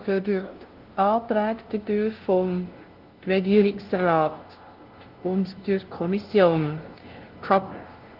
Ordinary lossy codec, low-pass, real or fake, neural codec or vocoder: Opus, 16 kbps; 5.4 kHz; fake; codec, 16 kHz, 0.5 kbps, FunCodec, trained on LibriTTS, 25 frames a second